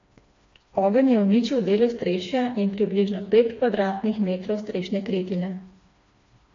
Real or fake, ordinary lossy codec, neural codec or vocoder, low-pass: fake; AAC, 32 kbps; codec, 16 kHz, 2 kbps, FreqCodec, smaller model; 7.2 kHz